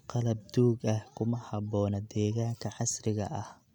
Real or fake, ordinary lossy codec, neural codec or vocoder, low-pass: real; none; none; 19.8 kHz